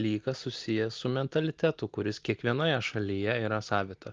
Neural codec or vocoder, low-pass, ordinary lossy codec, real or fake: none; 7.2 kHz; Opus, 32 kbps; real